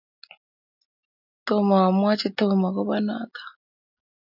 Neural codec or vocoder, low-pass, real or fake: none; 5.4 kHz; real